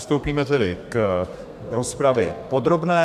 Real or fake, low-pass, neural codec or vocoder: fake; 14.4 kHz; codec, 32 kHz, 1.9 kbps, SNAC